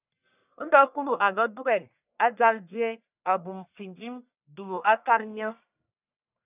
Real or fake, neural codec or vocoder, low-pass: fake; codec, 44.1 kHz, 1.7 kbps, Pupu-Codec; 3.6 kHz